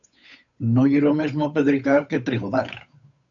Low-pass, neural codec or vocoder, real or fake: 7.2 kHz; codec, 16 kHz, 8 kbps, FunCodec, trained on Chinese and English, 25 frames a second; fake